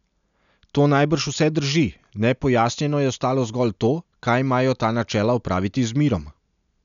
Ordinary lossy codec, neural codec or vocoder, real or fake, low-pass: none; none; real; 7.2 kHz